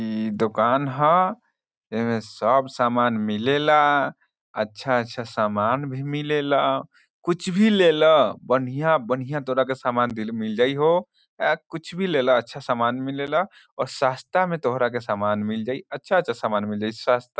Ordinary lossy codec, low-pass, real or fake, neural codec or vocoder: none; none; real; none